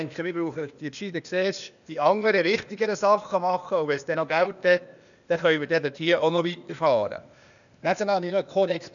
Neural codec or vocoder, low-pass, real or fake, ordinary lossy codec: codec, 16 kHz, 0.8 kbps, ZipCodec; 7.2 kHz; fake; none